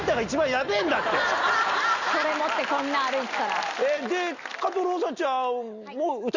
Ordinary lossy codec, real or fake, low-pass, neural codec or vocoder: Opus, 64 kbps; real; 7.2 kHz; none